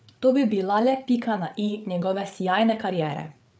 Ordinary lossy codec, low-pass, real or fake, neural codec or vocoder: none; none; fake; codec, 16 kHz, 8 kbps, FreqCodec, larger model